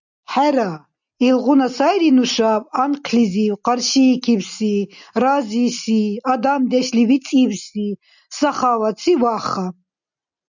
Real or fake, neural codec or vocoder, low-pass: real; none; 7.2 kHz